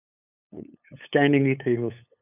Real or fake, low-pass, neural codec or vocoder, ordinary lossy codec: fake; 3.6 kHz; codec, 16 kHz, 8 kbps, FunCodec, trained on LibriTTS, 25 frames a second; none